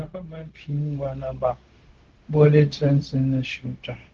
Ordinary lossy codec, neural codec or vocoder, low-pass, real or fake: Opus, 16 kbps; codec, 16 kHz, 0.4 kbps, LongCat-Audio-Codec; 7.2 kHz; fake